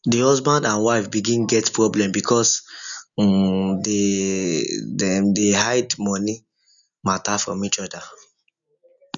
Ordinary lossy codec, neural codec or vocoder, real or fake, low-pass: none; none; real; 7.2 kHz